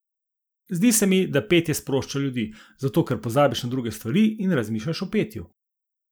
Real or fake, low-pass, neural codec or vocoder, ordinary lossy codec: real; none; none; none